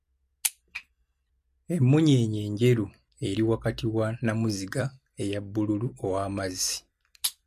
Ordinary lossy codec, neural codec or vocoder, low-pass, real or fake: MP3, 64 kbps; none; 14.4 kHz; real